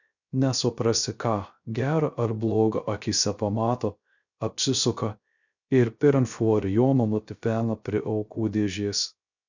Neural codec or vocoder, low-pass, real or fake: codec, 16 kHz, 0.3 kbps, FocalCodec; 7.2 kHz; fake